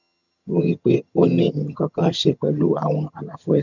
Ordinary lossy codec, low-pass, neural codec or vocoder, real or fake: none; 7.2 kHz; vocoder, 22.05 kHz, 80 mel bands, HiFi-GAN; fake